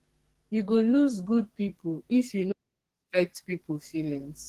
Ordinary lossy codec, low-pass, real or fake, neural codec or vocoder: Opus, 16 kbps; 14.4 kHz; fake; codec, 44.1 kHz, 2.6 kbps, SNAC